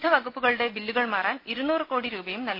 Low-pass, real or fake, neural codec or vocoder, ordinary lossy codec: 5.4 kHz; real; none; MP3, 32 kbps